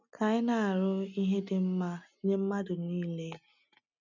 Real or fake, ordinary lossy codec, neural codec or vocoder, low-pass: real; none; none; 7.2 kHz